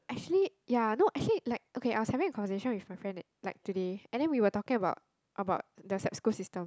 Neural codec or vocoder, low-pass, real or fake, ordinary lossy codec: none; none; real; none